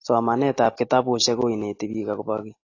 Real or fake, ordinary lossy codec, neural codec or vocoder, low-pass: real; AAC, 32 kbps; none; 7.2 kHz